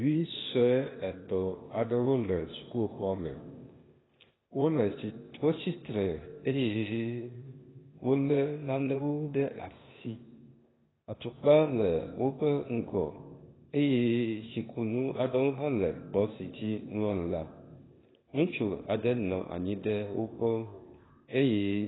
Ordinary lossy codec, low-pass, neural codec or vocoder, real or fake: AAC, 16 kbps; 7.2 kHz; codec, 16 kHz, 0.8 kbps, ZipCodec; fake